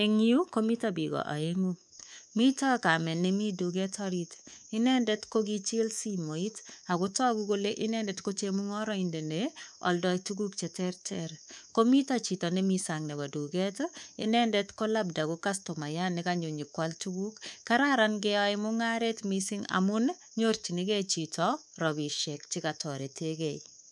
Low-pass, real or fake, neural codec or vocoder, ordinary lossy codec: none; fake; codec, 24 kHz, 3.1 kbps, DualCodec; none